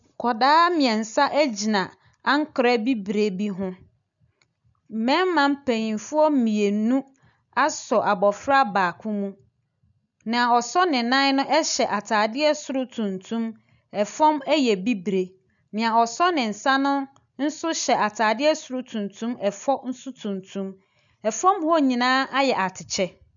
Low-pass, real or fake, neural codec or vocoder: 7.2 kHz; real; none